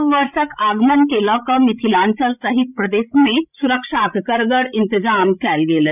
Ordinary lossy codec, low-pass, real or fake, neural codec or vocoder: none; 3.6 kHz; fake; codec, 16 kHz, 16 kbps, FreqCodec, larger model